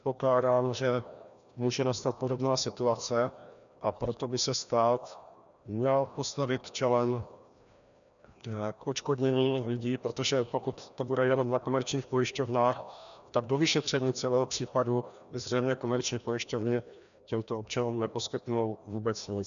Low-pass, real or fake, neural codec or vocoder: 7.2 kHz; fake; codec, 16 kHz, 1 kbps, FreqCodec, larger model